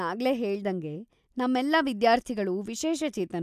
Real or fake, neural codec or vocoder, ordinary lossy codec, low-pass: real; none; none; 14.4 kHz